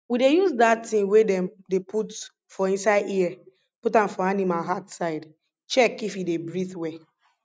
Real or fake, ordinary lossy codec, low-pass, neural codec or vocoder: real; none; none; none